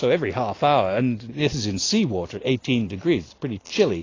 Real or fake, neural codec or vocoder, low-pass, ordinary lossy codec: real; none; 7.2 kHz; AAC, 32 kbps